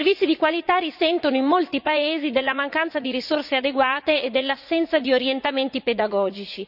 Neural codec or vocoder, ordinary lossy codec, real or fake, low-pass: vocoder, 44.1 kHz, 80 mel bands, Vocos; none; fake; 5.4 kHz